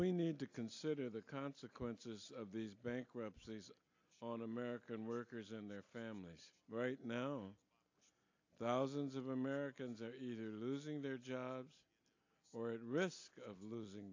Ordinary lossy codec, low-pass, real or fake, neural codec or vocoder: AAC, 48 kbps; 7.2 kHz; real; none